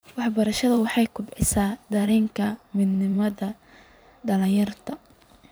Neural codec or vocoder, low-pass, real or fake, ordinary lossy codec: vocoder, 44.1 kHz, 128 mel bands every 512 samples, BigVGAN v2; none; fake; none